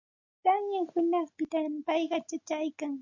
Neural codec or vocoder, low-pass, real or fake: none; 7.2 kHz; real